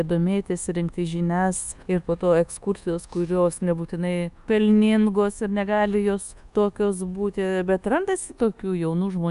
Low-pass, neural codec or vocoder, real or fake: 10.8 kHz; codec, 24 kHz, 1.2 kbps, DualCodec; fake